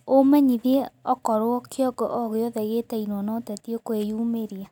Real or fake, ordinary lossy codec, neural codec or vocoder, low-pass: real; none; none; 19.8 kHz